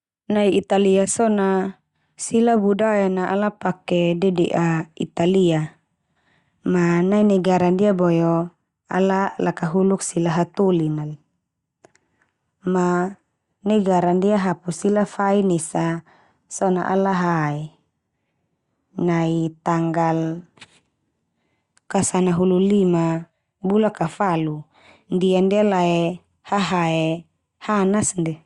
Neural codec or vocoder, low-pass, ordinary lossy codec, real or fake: none; 10.8 kHz; Opus, 64 kbps; real